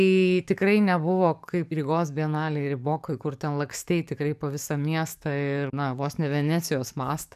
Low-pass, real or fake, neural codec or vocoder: 14.4 kHz; fake; codec, 44.1 kHz, 7.8 kbps, DAC